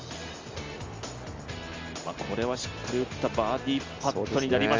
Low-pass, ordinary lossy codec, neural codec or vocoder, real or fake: 7.2 kHz; Opus, 32 kbps; none; real